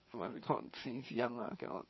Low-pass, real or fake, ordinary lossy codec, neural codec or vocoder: 7.2 kHz; fake; MP3, 24 kbps; codec, 16 kHz, 4 kbps, FreqCodec, larger model